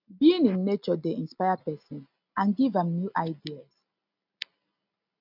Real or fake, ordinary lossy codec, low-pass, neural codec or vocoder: real; none; 5.4 kHz; none